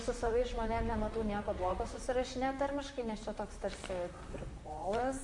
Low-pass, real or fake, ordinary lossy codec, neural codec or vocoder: 10.8 kHz; fake; MP3, 48 kbps; vocoder, 44.1 kHz, 128 mel bands, Pupu-Vocoder